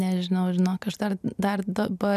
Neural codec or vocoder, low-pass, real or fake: none; 14.4 kHz; real